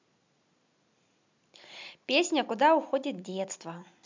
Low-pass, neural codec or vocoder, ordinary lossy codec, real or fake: 7.2 kHz; none; MP3, 64 kbps; real